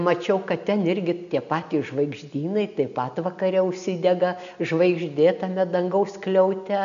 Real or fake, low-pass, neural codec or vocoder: real; 7.2 kHz; none